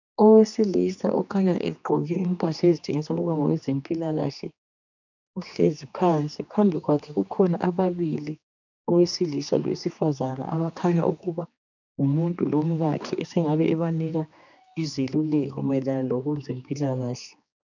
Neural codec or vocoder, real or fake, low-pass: codec, 16 kHz, 2 kbps, X-Codec, HuBERT features, trained on general audio; fake; 7.2 kHz